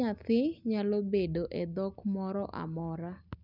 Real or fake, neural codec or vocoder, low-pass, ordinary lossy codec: real; none; 5.4 kHz; none